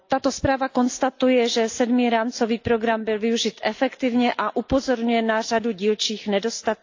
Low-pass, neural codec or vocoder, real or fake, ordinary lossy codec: 7.2 kHz; none; real; AAC, 48 kbps